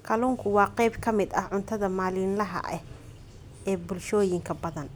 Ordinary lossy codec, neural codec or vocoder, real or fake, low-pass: none; none; real; none